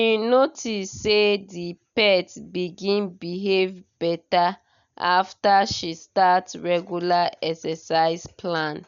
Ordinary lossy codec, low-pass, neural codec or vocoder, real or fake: none; 7.2 kHz; none; real